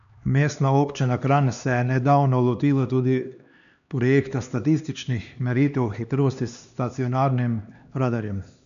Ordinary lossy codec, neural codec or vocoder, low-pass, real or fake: none; codec, 16 kHz, 2 kbps, X-Codec, HuBERT features, trained on LibriSpeech; 7.2 kHz; fake